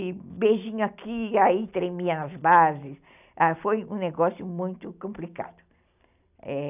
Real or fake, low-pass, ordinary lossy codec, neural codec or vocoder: real; 3.6 kHz; none; none